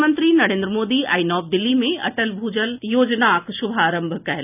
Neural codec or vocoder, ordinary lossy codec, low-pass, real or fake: none; none; 3.6 kHz; real